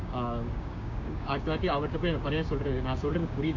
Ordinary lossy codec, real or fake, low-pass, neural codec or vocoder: AAC, 48 kbps; fake; 7.2 kHz; codec, 16 kHz, 6 kbps, DAC